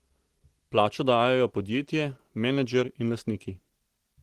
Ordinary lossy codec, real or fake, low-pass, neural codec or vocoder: Opus, 16 kbps; real; 14.4 kHz; none